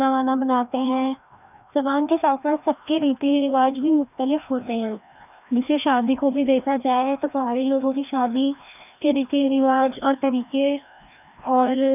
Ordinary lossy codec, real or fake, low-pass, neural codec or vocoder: none; fake; 3.6 kHz; codec, 16 kHz, 1 kbps, FreqCodec, larger model